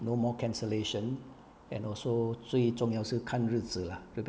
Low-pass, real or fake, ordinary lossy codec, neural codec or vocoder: none; real; none; none